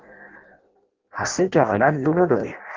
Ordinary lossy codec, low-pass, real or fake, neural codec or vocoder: Opus, 16 kbps; 7.2 kHz; fake; codec, 16 kHz in and 24 kHz out, 0.6 kbps, FireRedTTS-2 codec